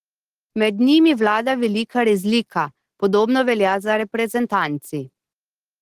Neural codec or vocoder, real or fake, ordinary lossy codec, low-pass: none; real; Opus, 16 kbps; 14.4 kHz